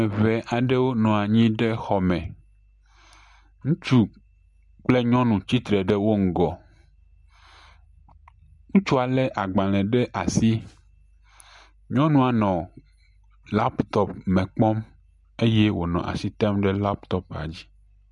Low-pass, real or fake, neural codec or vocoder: 10.8 kHz; real; none